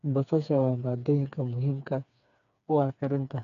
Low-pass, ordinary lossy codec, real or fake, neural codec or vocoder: 7.2 kHz; none; fake; codec, 16 kHz, 4 kbps, FreqCodec, smaller model